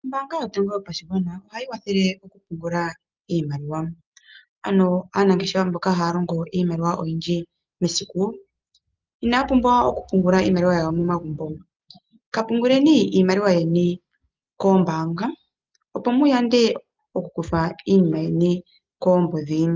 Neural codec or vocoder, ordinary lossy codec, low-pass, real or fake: none; Opus, 24 kbps; 7.2 kHz; real